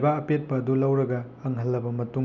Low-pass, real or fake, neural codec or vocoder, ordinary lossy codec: 7.2 kHz; real; none; none